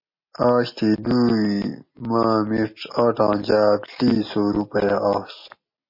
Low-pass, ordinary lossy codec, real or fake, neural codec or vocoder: 5.4 kHz; MP3, 24 kbps; real; none